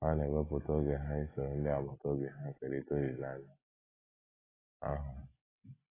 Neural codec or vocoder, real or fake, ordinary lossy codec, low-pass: none; real; AAC, 16 kbps; 3.6 kHz